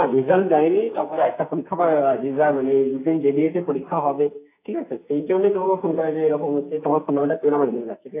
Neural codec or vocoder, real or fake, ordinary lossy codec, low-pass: codec, 44.1 kHz, 2.6 kbps, SNAC; fake; AAC, 24 kbps; 3.6 kHz